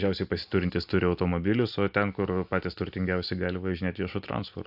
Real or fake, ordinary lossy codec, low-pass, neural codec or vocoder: real; AAC, 48 kbps; 5.4 kHz; none